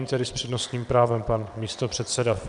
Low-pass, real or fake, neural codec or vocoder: 9.9 kHz; fake; vocoder, 22.05 kHz, 80 mel bands, WaveNeXt